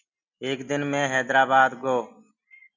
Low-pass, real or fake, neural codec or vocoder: 7.2 kHz; real; none